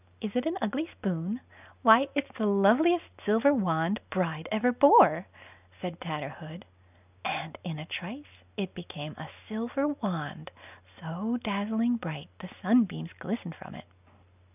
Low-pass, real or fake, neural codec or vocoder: 3.6 kHz; real; none